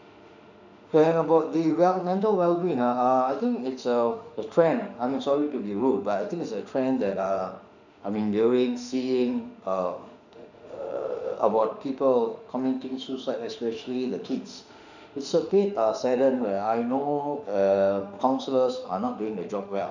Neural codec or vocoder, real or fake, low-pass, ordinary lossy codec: autoencoder, 48 kHz, 32 numbers a frame, DAC-VAE, trained on Japanese speech; fake; 7.2 kHz; none